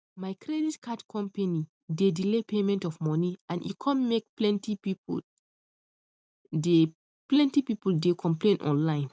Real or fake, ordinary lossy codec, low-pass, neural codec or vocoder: real; none; none; none